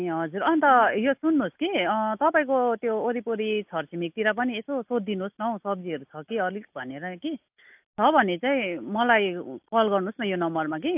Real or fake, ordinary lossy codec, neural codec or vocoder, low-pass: real; AAC, 32 kbps; none; 3.6 kHz